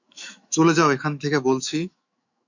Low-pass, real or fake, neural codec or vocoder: 7.2 kHz; fake; autoencoder, 48 kHz, 128 numbers a frame, DAC-VAE, trained on Japanese speech